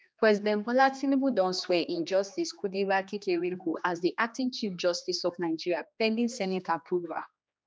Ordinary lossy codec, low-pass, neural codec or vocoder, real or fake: none; none; codec, 16 kHz, 2 kbps, X-Codec, HuBERT features, trained on general audio; fake